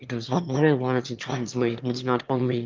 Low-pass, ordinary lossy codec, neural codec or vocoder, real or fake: 7.2 kHz; Opus, 24 kbps; autoencoder, 22.05 kHz, a latent of 192 numbers a frame, VITS, trained on one speaker; fake